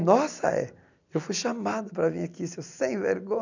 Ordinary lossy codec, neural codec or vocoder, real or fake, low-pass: none; none; real; 7.2 kHz